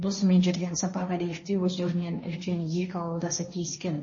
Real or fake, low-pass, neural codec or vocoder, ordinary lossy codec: fake; 7.2 kHz; codec, 16 kHz, 1.1 kbps, Voila-Tokenizer; MP3, 32 kbps